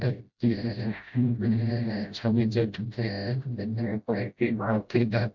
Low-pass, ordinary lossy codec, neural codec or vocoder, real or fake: 7.2 kHz; MP3, 64 kbps; codec, 16 kHz, 0.5 kbps, FreqCodec, smaller model; fake